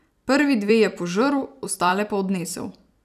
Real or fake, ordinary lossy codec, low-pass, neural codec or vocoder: fake; none; 14.4 kHz; vocoder, 44.1 kHz, 128 mel bands every 256 samples, BigVGAN v2